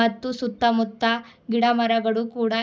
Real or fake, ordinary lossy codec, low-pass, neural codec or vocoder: real; none; none; none